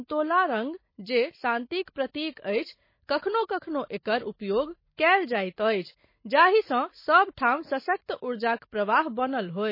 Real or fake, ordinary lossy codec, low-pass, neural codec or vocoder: real; MP3, 24 kbps; 5.4 kHz; none